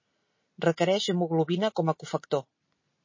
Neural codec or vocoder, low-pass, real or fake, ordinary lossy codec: none; 7.2 kHz; real; MP3, 32 kbps